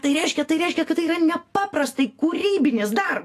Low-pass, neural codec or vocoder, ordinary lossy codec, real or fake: 14.4 kHz; autoencoder, 48 kHz, 128 numbers a frame, DAC-VAE, trained on Japanese speech; AAC, 48 kbps; fake